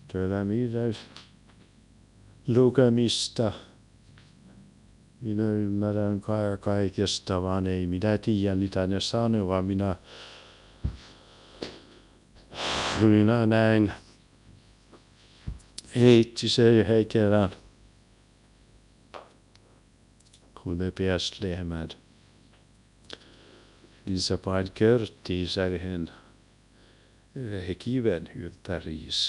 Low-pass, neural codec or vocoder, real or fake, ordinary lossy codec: 10.8 kHz; codec, 24 kHz, 0.9 kbps, WavTokenizer, large speech release; fake; none